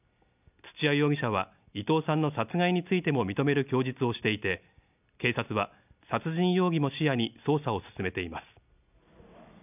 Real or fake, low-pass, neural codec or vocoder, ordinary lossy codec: real; 3.6 kHz; none; none